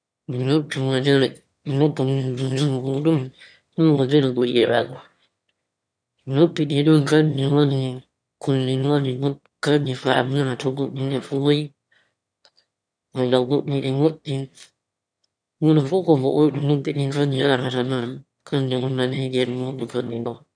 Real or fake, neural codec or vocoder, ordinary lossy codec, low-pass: fake; autoencoder, 22.05 kHz, a latent of 192 numbers a frame, VITS, trained on one speaker; none; 9.9 kHz